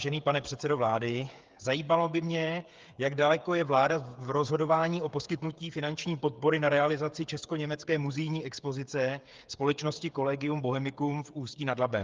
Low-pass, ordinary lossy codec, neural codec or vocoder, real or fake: 7.2 kHz; Opus, 32 kbps; codec, 16 kHz, 8 kbps, FreqCodec, smaller model; fake